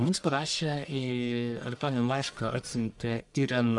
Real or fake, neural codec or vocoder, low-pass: fake; codec, 44.1 kHz, 1.7 kbps, Pupu-Codec; 10.8 kHz